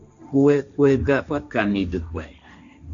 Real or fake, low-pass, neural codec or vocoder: fake; 7.2 kHz; codec, 16 kHz, 1.1 kbps, Voila-Tokenizer